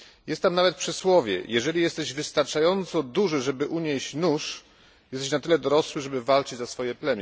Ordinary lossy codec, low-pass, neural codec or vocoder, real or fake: none; none; none; real